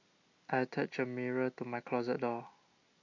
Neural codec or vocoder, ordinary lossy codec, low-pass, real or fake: none; MP3, 48 kbps; 7.2 kHz; real